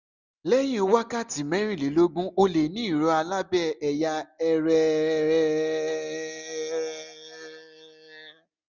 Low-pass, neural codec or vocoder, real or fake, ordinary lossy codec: 7.2 kHz; none; real; none